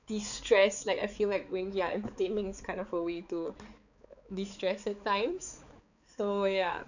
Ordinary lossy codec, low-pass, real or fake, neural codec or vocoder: none; 7.2 kHz; fake; codec, 16 kHz, 4 kbps, X-Codec, HuBERT features, trained on balanced general audio